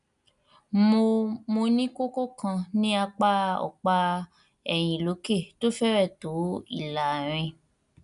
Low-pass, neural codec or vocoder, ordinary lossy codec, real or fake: 10.8 kHz; none; none; real